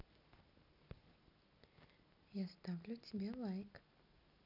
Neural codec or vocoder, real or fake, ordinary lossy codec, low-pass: none; real; none; 5.4 kHz